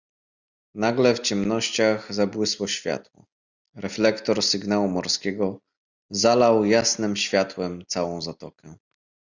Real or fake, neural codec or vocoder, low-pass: real; none; 7.2 kHz